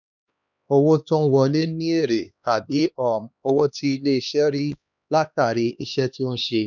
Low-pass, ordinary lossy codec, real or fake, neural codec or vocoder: 7.2 kHz; none; fake; codec, 16 kHz, 2 kbps, X-Codec, HuBERT features, trained on LibriSpeech